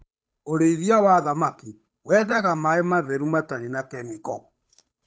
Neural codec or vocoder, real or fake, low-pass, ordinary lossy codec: codec, 16 kHz, 8 kbps, FunCodec, trained on Chinese and English, 25 frames a second; fake; none; none